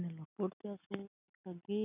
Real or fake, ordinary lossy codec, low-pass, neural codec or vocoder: real; none; 3.6 kHz; none